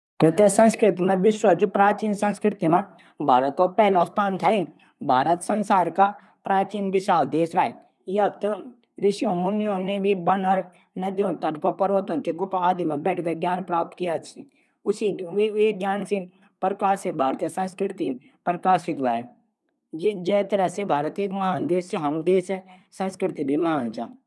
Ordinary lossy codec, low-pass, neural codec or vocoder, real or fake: none; none; codec, 24 kHz, 1 kbps, SNAC; fake